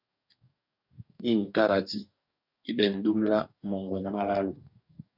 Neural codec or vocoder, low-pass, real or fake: codec, 44.1 kHz, 2.6 kbps, DAC; 5.4 kHz; fake